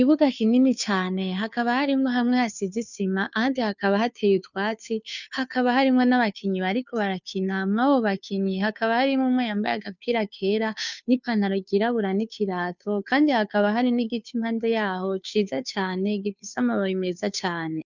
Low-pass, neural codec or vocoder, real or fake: 7.2 kHz; codec, 16 kHz, 2 kbps, FunCodec, trained on Chinese and English, 25 frames a second; fake